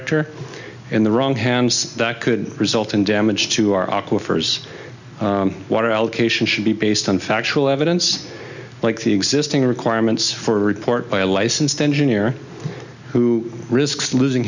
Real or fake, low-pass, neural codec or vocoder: real; 7.2 kHz; none